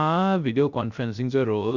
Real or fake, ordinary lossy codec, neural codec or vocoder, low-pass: fake; Opus, 64 kbps; codec, 16 kHz, 0.3 kbps, FocalCodec; 7.2 kHz